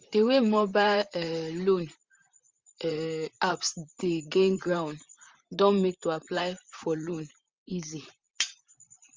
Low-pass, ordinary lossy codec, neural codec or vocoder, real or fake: 7.2 kHz; Opus, 32 kbps; vocoder, 44.1 kHz, 128 mel bands, Pupu-Vocoder; fake